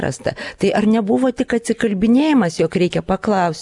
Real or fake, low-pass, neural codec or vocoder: real; 10.8 kHz; none